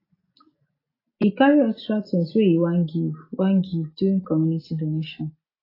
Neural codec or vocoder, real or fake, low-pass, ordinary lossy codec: none; real; 5.4 kHz; AAC, 24 kbps